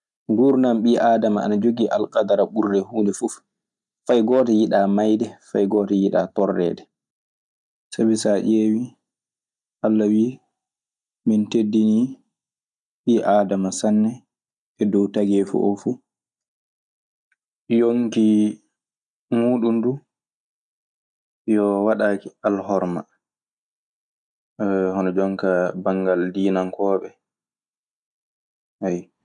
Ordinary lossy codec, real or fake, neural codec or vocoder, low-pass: none; real; none; 10.8 kHz